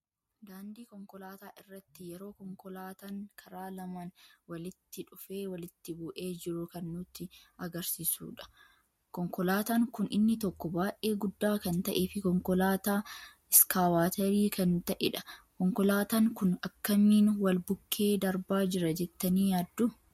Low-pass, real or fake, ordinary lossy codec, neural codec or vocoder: 19.8 kHz; real; MP3, 64 kbps; none